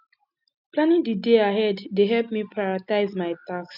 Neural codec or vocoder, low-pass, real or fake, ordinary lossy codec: none; 5.4 kHz; real; none